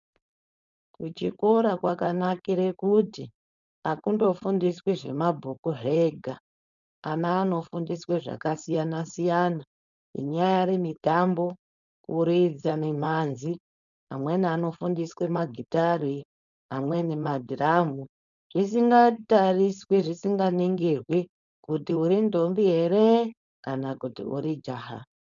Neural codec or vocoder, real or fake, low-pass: codec, 16 kHz, 4.8 kbps, FACodec; fake; 7.2 kHz